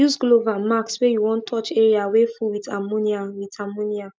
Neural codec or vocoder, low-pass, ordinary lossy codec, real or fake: none; none; none; real